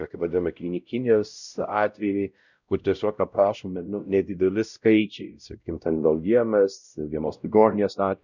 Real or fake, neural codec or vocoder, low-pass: fake; codec, 16 kHz, 0.5 kbps, X-Codec, WavLM features, trained on Multilingual LibriSpeech; 7.2 kHz